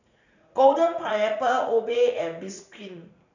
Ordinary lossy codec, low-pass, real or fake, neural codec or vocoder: none; 7.2 kHz; fake; vocoder, 44.1 kHz, 80 mel bands, Vocos